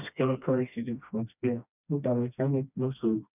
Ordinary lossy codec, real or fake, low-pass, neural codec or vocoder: none; fake; 3.6 kHz; codec, 16 kHz, 1 kbps, FreqCodec, smaller model